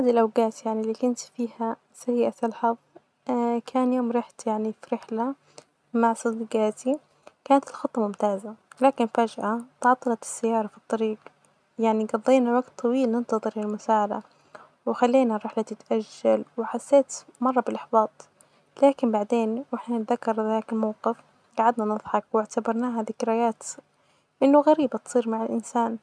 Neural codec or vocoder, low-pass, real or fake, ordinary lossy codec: none; none; real; none